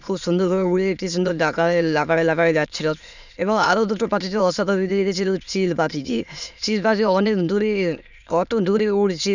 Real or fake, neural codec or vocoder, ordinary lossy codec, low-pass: fake; autoencoder, 22.05 kHz, a latent of 192 numbers a frame, VITS, trained on many speakers; none; 7.2 kHz